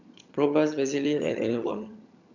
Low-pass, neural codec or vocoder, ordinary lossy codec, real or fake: 7.2 kHz; vocoder, 22.05 kHz, 80 mel bands, HiFi-GAN; none; fake